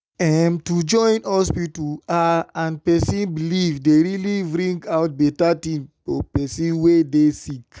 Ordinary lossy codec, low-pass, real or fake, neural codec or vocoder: none; none; real; none